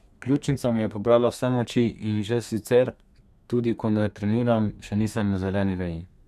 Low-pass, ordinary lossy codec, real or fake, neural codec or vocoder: 14.4 kHz; none; fake; codec, 32 kHz, 1.9 kbps, SNAC